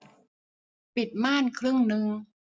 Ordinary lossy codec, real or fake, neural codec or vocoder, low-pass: none; real; none; none